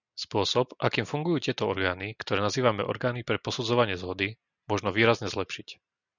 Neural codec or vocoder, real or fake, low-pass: none; real; 7.2 kHz